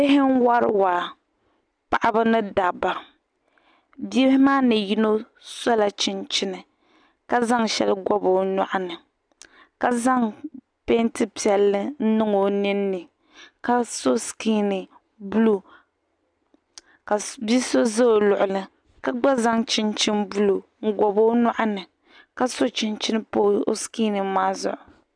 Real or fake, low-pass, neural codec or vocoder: real; 9.9 kHz; none